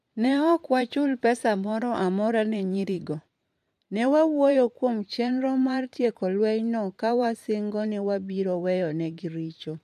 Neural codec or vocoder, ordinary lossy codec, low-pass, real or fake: vocoder, 44.1 kHz, 128 mel bands every 512 samples, BigVGAN v2; MP3, 64 kbps; 14.4 kHz; fake